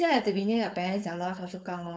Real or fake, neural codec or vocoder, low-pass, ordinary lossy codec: fake; codec, 16 kHz, 4.8 kbps, FACodec; none; none